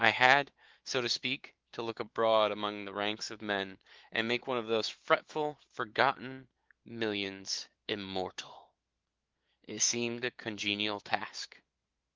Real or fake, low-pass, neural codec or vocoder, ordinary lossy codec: fake; 7.2 kHz; autoencoder, 48 kHz, 128 numbers a frame, DAC-VAE, trained on Japanese speech; Opus, 16 kbps